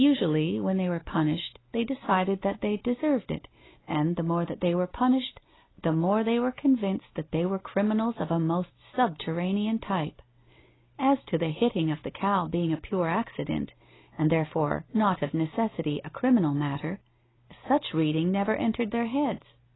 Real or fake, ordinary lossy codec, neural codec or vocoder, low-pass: real; AAC, 16 kbps; none; 7.2 kHz